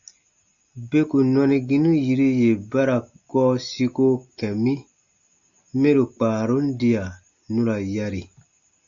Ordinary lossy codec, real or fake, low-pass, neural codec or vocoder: Opus, 64 kbps; real; 7.2 kHz; none